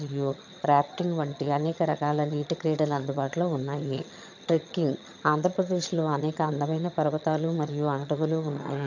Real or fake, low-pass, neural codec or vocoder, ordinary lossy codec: fake; 7.2 kHz; vocoder, 22.05 kHz, 80 mel bands, HiFi-GAN; none